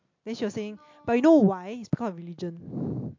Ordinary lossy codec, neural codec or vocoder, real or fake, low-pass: MP3, 48 kbps; none; real; 7.2 kHz